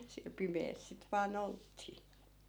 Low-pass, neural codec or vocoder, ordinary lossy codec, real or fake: none; none; none; real